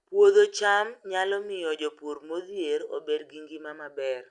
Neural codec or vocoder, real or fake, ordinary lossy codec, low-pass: none; real; Opus, 64 kbps; 10.8 kHz